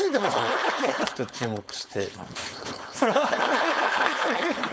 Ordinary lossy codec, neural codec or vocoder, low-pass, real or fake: none; codec, 16 kHz, 4.8 kbps, FACodec; none; fake